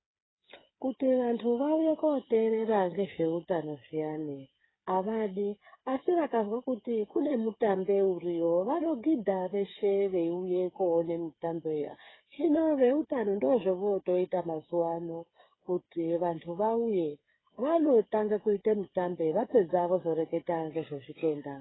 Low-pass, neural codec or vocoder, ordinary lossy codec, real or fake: 7.2 kHz; codec, 16 kHz, 8 kbps, FreqCodec, smaller model; AAC, 16 kbps; fake